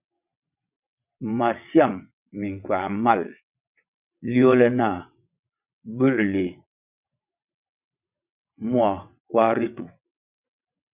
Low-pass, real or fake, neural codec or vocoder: 3.6 kHz; fake; vocoder, 22.05 kHz, 80 mel bands, WaveNeXt